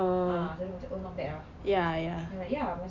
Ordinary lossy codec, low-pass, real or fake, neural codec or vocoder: none; 7.2 kHz; real; none